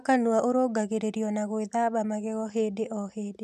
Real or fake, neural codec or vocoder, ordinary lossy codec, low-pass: real; none; none; 14.4 kHz